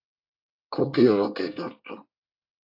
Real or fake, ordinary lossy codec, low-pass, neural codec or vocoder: fake; AAC, 48 kbps; 5.4 kHz; codec, 24 kHz, 1 kbps, SNAC